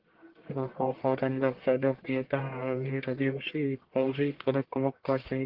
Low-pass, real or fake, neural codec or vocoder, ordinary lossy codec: 5.4 kHz; fake; codec, 44.1 kHz, 1.7 kbps, Pupu-Codec; Opus, 16 kbps